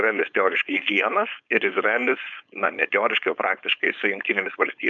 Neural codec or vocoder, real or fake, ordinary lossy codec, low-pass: codec, 16 kHz, 4.8 kbps, FACodec; fake; MP3, 96 kbps; 7.2 kHz